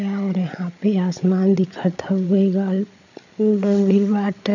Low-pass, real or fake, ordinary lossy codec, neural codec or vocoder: 7.2 kHz; fake; none; codec, 16 kHz, 8 kbps, FreqCodec, larger model